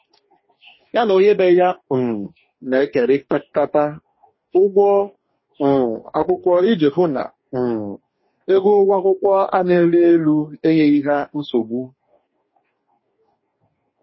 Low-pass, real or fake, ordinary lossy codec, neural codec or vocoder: 7.2 kHz; fake; MP3, 24 kbps; codec, 44.1 kHz, 2.6 kbps, DAC